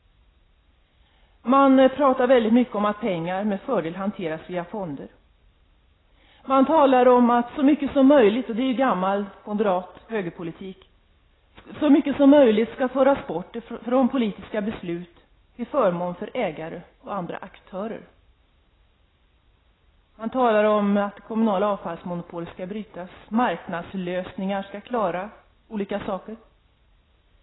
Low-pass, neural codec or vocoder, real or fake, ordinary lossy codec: 7.2 kHz; none; real; AAC, 16 kbps